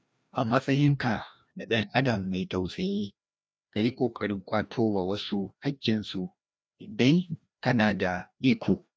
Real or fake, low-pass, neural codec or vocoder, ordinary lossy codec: fake; none; codec, 16 kHz, 1 kbps, FreqCodec, larger model; none